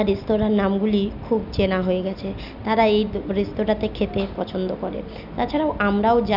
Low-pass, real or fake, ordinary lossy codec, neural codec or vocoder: 5.4 kHz; real; none; none